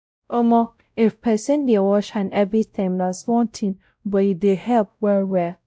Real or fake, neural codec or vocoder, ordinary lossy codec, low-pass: fake; codec, 16 kHz, 0.5 kbps, X-Codec, WavLM features, trained on Multilingual LibriSpeech; none; none